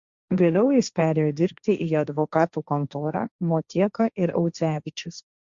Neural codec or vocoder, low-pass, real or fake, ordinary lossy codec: codec, 16 kHz, 1.1 kbps, Voila-Tokenizer; 7.2 kHz; fake; Opus, 64 kbps